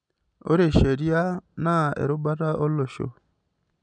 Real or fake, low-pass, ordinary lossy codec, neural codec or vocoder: real; 9.9 kHz; none; none